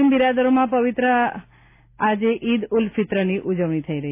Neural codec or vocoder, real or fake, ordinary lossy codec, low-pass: none; real; none; 3.6 kHz